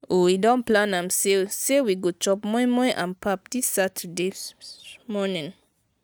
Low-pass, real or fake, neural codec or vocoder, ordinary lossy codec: none; real; none; none